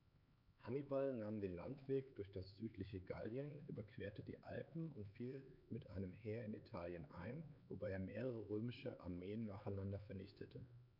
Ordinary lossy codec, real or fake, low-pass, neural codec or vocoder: none; fake; 5.4 kHz; codec, 16 kHz, 4 kbps, X-Codec, HuBERT features, trained on LibriSpeech